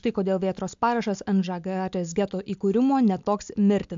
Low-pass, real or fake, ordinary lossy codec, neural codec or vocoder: 7.2 kHz; real; AAC, 64 kbps; none